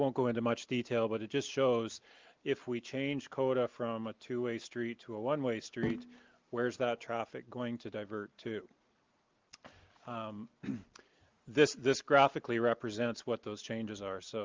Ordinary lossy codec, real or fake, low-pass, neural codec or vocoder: Opus, 32 kbps; real; 7.2 kHz; none